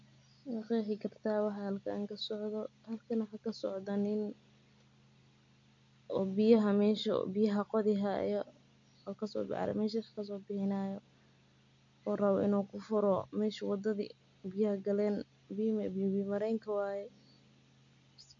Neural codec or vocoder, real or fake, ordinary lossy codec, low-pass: none; real; AAC, 64 kbps; 7.2 kHz